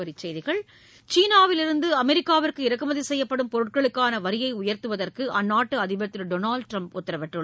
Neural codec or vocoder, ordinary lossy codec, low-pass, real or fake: none; none; none; real